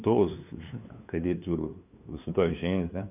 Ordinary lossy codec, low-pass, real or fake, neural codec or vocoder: none; 3.6 kHz; fake; codec, 16 kHz, 2 kbps, FunCodec, trained on LibriTTS, 25 frames a second